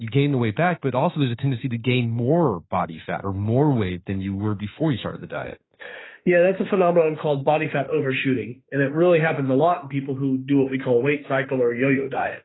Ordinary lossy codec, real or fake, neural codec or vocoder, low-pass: AAC, 16 kbps; fake; autoencoder, 48 kHz, 32 numbers a frame, DAC-VAE, trained on Japanese speech; 7.2 kHz